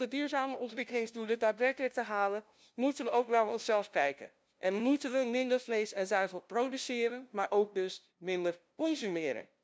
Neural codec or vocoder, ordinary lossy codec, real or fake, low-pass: codec, 16 kHz, 0.5 kbps, FunCodec, trained on LibriTTS, 25 frames a second; none; fake; none